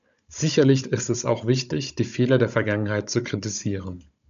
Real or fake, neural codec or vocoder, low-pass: fake; codec, 16 kHz, 16 kbps, FunCodec, trained on Chinese and English, 50 frames a second; 7.2 kHz